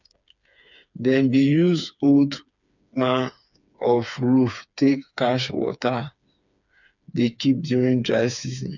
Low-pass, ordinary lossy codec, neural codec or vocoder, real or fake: 7.2 kHz; none; codec, 16 kHz, 4 kbps, FreqCodec, smaller model; fake